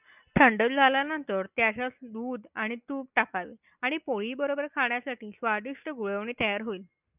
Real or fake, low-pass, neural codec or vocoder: real; 3.6 kHz; none